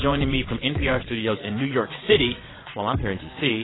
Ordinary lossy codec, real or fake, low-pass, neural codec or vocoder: AAC, 16 kbps; fake; 7.2 kHz; vocoder, 22.05 kHz, 80 mel bands, WaveNeXt